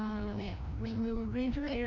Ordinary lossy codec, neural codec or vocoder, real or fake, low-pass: none; codec, 16 kHz, 1 kbps, FreqCodec, larger model; fake; 7.2 kHz